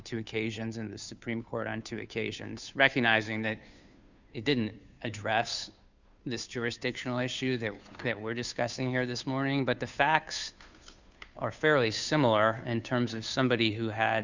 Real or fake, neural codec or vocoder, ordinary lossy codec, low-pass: fake; codec, 16 kHz, 2 kbps, FunCodec, trained on Chinese and English, 25 frames a second; Opus, 64 kbps; 7.2 kHz